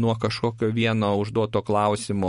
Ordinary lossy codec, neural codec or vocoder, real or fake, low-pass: MP3, 48 kbps; none; real; 10.8 kHz